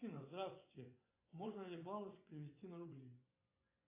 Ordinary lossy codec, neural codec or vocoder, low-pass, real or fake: AAC, 32 kbps; vocoder, 24 kHz, 100 mel bands, Vocos; 3.6 kHz; fake